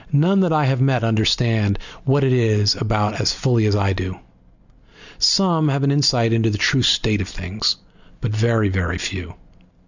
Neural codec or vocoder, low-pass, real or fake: none; 7.2 kHz; real